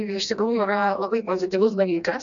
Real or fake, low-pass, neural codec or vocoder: fake; 7.2 kHz; codec, 16 kHz, 1 kbps, FreqCodec, smaller model